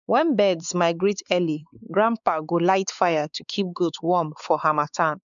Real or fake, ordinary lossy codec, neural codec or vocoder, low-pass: fake; none; codec, 16 kHz, 4 kbps, X-Codec, WavLM features, trained on Multilingual LibriSpeech; 7.2 kHz